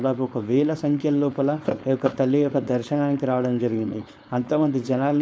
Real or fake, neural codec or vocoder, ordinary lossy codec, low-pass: fake; codec, 16 kHz, 4.8 kbps, FACodec; none; none